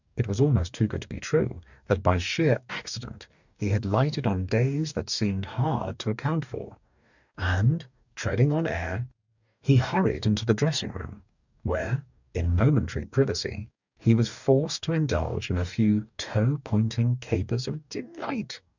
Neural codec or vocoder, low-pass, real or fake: codec, 44.1 kHz, 2.6 kbps, DAC; 7.2 kHz; fake